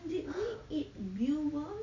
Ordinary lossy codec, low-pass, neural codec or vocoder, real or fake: none; 7.2 kHz; none; real